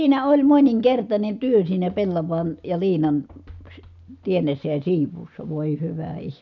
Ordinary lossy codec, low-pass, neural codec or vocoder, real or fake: none; 7.2 kHz; none; real